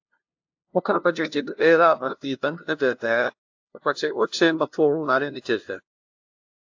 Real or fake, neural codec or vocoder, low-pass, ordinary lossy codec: fake; codec, 16 kHz, 0.5 kbps, FunCodec, trained on LibriTTS, 25 frames a second; 7.2 kHz; AAC, 48 kbps